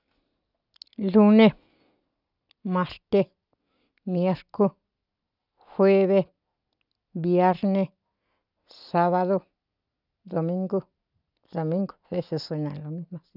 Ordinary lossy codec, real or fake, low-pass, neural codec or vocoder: none; real; 5.4 kHz; none